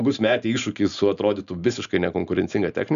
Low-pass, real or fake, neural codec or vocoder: 7.2 kHz; real; none